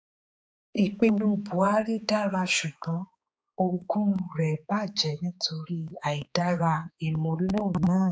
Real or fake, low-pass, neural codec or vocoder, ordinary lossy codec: fake; none; codec, 16 kHz, 4 kbps, X-Codec, HuBERT features, trained on balanced general audio; none